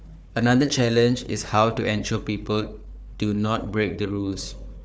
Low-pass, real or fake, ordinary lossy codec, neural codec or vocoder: none; fake; none; codec, 16 kHz, 4 kbps, FunCodec, trained on Chinese and English, 50 frames a second